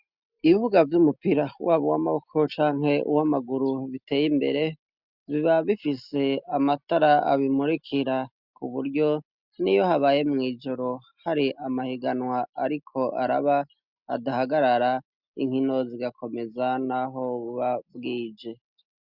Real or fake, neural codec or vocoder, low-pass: real; none; 5.4 kHz